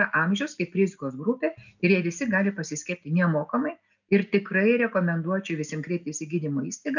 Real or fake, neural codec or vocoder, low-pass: real; none; 7.2 kHz